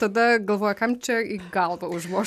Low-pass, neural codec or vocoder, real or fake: 14.4 kHz; none; real